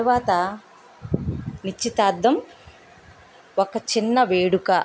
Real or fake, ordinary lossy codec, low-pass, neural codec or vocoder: real; none; none; none